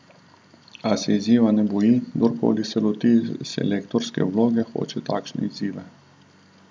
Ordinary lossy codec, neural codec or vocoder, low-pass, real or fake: none; none; none; real